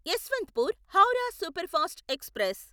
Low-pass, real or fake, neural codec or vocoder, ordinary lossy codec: none; real; none; none